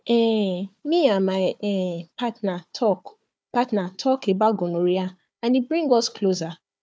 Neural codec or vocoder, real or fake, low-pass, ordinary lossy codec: codec, 16 kHz, 4 kbps, FunCodec, trained on Chinese and English, 50 frames a second; fake; none; none